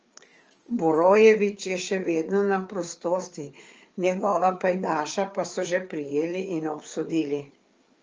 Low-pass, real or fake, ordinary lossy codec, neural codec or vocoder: 7.2 kHz; fake; Opus, 24 kbps; codec, 16 kHz, 4 kbps, FreqCodec, larger model